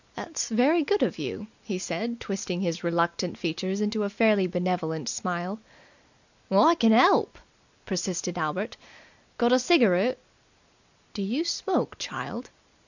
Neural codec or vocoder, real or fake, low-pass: none; real; 7.2 kHz